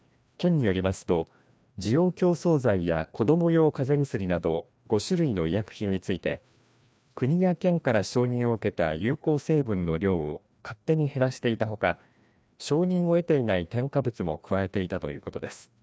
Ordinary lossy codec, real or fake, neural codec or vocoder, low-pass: none; fake; codec, 16 kHz, 1 kbps, FreqCodec, larger model; none